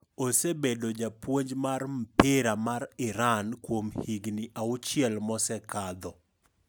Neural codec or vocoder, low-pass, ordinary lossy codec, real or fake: none; none; none; real